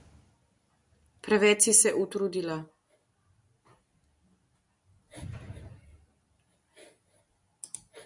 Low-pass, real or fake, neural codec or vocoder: 10.8 kHz; real; none